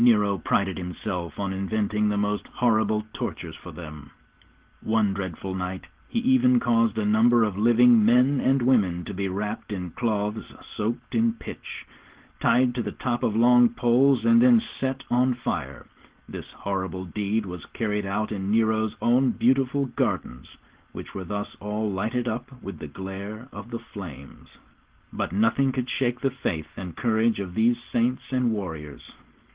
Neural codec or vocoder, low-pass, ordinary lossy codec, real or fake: none; 3.6 kHz; Opus, 16 kbps; real